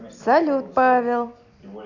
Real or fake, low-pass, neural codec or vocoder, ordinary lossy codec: real; 7.2 kHz; none; none